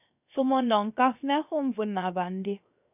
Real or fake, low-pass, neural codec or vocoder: fake; 3.6 kHz; codec, 16 kHz, 0.7 kbps, FocalCodec